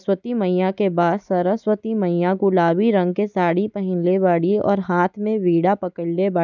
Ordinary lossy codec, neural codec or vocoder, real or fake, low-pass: none; none; real; 7.2 kHz